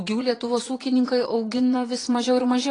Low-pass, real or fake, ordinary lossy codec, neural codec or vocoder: 9.9 kHz; fake; AAC, 32 kbps; vocoder, 22.05 kHz, 80 mel bands, Vocos